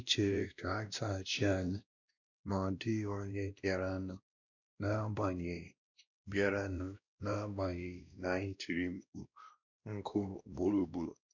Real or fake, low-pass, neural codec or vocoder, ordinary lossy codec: fake; 7.2 kHz; codec, 16 kHz, 1 kbps, X-Codec, WavLM features, trained on Multilingual LibriSpeech; none